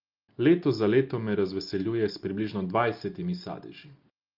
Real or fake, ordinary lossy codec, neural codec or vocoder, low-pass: real; Opus, 24 kbps; none; 5.4 kHz